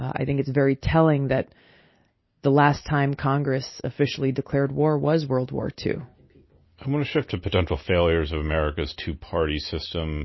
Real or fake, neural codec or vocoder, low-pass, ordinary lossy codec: real; none; 7.2 kHz; MP3, 24 kbps